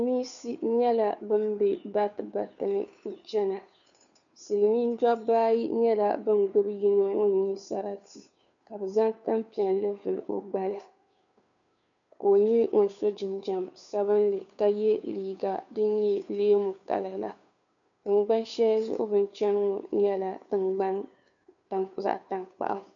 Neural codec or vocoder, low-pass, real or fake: codec, 16 kHz, 2 kbps, FunCodec, trained on Chinese and English, 25 frames a second; 7.2 kHz; fake